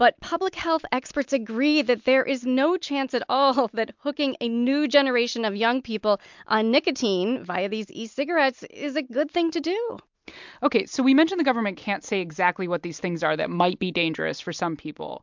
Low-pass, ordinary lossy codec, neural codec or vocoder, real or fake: 7.2 kHz; MP3, 64 kbps; none; real